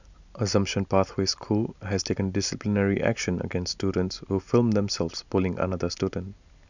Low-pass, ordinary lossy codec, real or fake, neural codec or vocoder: 7.2 kHz; none; real; none